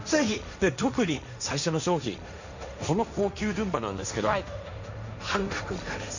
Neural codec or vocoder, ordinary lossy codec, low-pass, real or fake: codec, 16 kHz, 1.1 kbps, Voila-Tokenizer; none; 7.2 kHz; fake